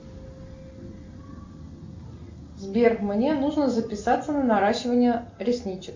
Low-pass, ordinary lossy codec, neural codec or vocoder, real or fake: 7.2 kHz; MP3, 48 kbps; none; real